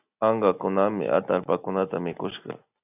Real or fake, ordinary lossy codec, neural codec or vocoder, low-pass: real; AAC, 32 kbps; none; 3.6 kHz